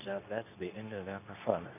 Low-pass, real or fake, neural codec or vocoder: 3.6 kHz; fake; codec, 24 kHz, 0.9 kbps, WavTokenizer, medium speech release version 2